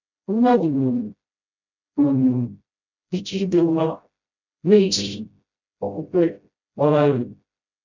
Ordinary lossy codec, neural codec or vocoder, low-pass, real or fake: none; codec, 16 kHz, 0.5 kbps, FreqCodec, smaller model; 7.2 kHz; fake